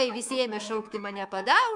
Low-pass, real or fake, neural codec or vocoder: 10.8 kHz; fake; codec, 24 kHz, 3.1 kbps, DualCodec